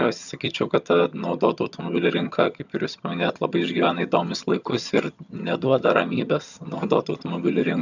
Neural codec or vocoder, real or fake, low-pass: vocoder, 22.05 kHz, 80 mel bands, HiFi-GAN; fake; 7.2 kHz